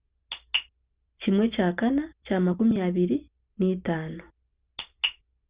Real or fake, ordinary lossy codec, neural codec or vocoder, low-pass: real; Opus, 64 kbps; none; 3.6 kHz